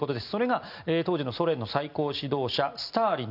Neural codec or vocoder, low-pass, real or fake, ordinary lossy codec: none; 5.4 kHz; real; none